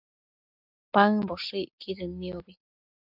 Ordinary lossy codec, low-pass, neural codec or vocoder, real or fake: AAC, 48 kbps; 5.4 kHz; none; real